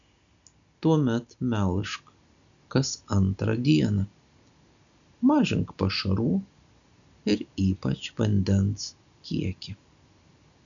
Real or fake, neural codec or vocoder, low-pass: real; none; 7.2 kHz